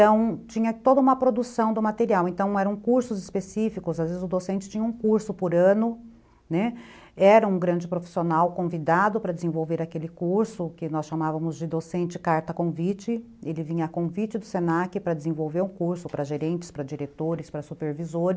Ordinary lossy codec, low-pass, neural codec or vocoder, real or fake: none; none; none; real